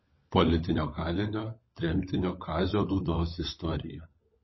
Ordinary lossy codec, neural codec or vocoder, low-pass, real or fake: MP3, 24 kbps; codec, 16 kHz, 16 kbps, FunCodec, trained on LibriTTS, 50 frames a second; 7.2 kHz; fake